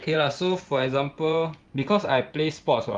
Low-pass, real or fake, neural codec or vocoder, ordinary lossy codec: 9.9 kHz; fake; vocoder, 24 kHz, 100 mel bands, Vocos; Opus, 32 kbps